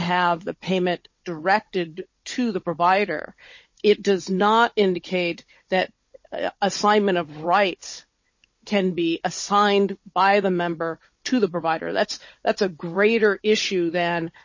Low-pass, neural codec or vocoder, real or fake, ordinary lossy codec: 7.2 kHz; codec, 16 kHz, 16 kbps, FunCodec, trained on Chinese and English, 50 frames a second; fake; MP3, 32 kbps